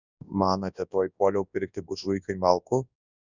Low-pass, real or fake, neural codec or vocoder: 7.2 kHz; fake; codec, 24 kHz, 0.9 kbps, WavTokenizer, large speech release